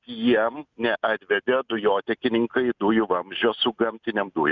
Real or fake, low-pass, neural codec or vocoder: real; 7.2 kHz; none